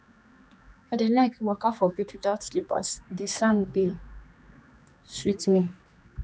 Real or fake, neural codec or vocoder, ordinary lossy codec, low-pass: fake; codec, 16 kHz, 2 kbps, X-Codec, HuBERT features, trained on general audio; none; none